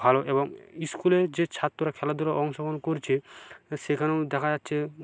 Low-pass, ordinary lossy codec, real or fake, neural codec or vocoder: none; none; real; none